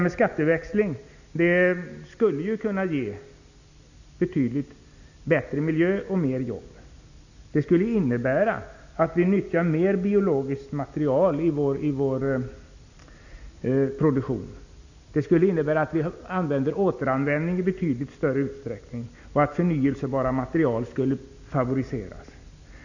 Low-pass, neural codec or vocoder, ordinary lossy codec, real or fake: 7.2 kHz; none; none; real